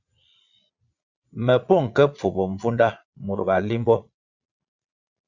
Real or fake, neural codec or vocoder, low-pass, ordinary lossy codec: fake; vocoder, 22.05 kHz, 80 mel bands, Vocos; 7.2 kHz; Opus, 64 kbps